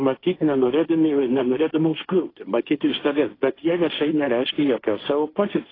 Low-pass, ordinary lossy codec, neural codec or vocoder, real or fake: 5.4 kHz; AAC, 24 kbps; codec, 16 kHz, 1.1 kbps, Voila-Tokenizer; fake